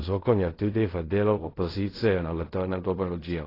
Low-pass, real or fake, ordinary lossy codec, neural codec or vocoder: 5.4 kHz; fake; AAC, 24 kbps; codec, 16 kHz in and 24 kHz out, 0.4 kbps, LongCat-Audio-Codec, fine tuned four codebook decoder